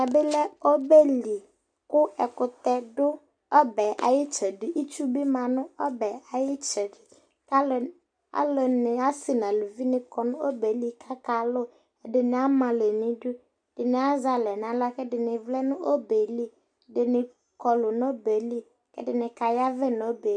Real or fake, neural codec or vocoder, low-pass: real; none; 9.9 kHz